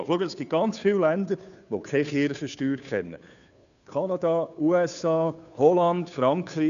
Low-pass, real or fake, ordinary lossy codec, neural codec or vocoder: 7.2 kHz; fake; none; codec, 16 kHz, 2 kbps, FunCodec, trained on Chinese and English, 25 frames a second